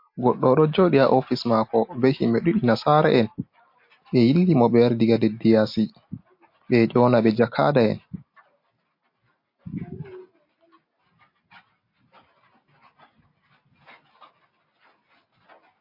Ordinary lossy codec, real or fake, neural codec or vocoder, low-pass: MP3, 32 kbps; real; none; 5.4 kHz